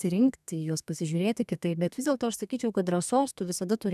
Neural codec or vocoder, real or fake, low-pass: codec, 32 kHz, 1.9 kbps, SNAC; fake; 14.4 kHz